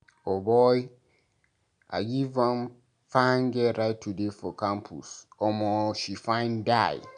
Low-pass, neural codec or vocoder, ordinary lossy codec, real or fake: 9.9 kHz; none; none; real